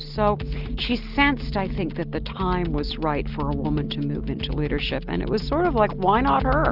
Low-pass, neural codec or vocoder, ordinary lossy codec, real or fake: 5.4 kHz; none; Opus, 32 kbps; real